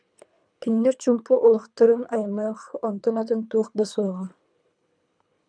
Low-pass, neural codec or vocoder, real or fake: 9.9 kHz; codec, 24 kHz, 3 kbps, HILCodec; fake